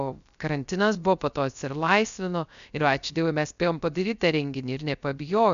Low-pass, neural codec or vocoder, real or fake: 7.2 kHz; codec, 16 kHz, 0.3 kbps, FocalCodec; fake